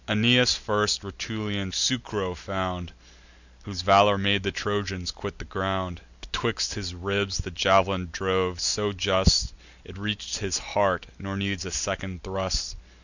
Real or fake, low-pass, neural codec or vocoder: real; 7.2 kHz; none